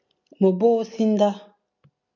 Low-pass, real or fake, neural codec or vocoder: 7.2 kHz; real; none